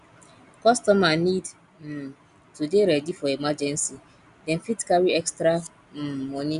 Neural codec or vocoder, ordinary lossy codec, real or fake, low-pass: none; none; real; 10.8 kHz